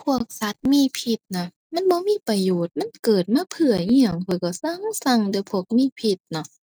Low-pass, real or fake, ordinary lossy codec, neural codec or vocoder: none; real; none; none